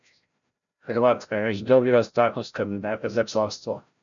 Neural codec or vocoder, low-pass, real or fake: codec, 16 kHz, 0.5 kbps, FreqCodec, larger model; 7.2 kHz; fake